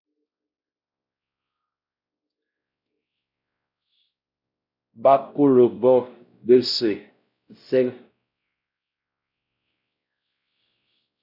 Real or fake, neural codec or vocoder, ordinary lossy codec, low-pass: fake; codec, 16 kHz, 0.5 kbps, X-Codec, WavLM features, trained on Multilingual LibriSpeech; AAC, 48 kbps; 5.4 kHz